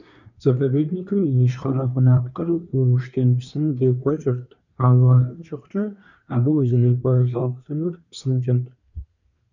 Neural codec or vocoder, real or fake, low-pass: codec, 24 kHz, 1 kbps, SNAC; fake; 7.2 kHz